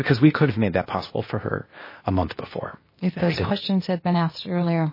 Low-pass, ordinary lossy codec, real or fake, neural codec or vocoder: 5.4 kHz; MP3, 24 kbps; fake; codec, 16 kHz, 0.8 kbps, ZipCodec